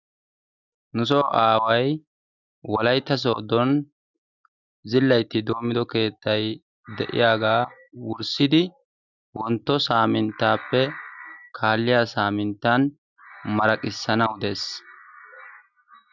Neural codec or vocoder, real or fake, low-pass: none; real; 7.2 kHz